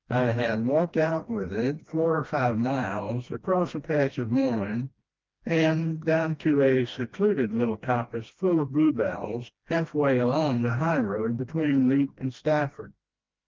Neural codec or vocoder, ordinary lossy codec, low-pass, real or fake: codec, 16 kHz, 1 kbps, FreqCodec, smaller model; Opus, 32 kbps; 7.2 kHz; fake